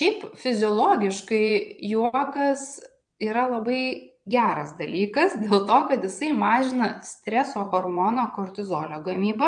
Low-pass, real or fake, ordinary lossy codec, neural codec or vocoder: 9.9 kHz; fake; MP3, 64 kbps; vocoder, 22.05 kHz, 80 mel bands, Vocos